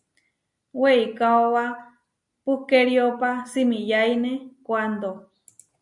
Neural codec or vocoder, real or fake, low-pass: none; real; 10.8 kHz